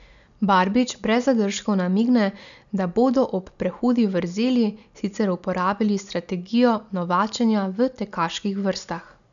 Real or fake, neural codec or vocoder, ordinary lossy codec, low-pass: real; none; MP3, 96 kbps; 7.2 kHz